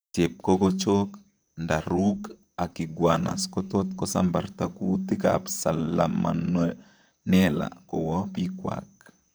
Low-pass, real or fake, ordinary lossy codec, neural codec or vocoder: none; fake; none; vocoder, 44.1 kHz, 128 mel bands, Pupu-Vocoder